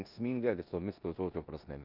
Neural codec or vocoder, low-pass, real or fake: codec, 16 kHz in and 24 kHz out, 0.9 kbps, LongCat-Audio-Codec, four codebook decoder; 5.4 kHz; fake